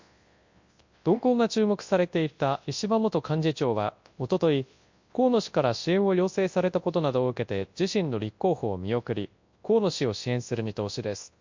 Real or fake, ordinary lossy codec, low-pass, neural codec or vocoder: fake; MP3, 48 kbps; 7.2 kHz; codec, 24 kHz, 0.9 kbps, WavTokenizer, large speech release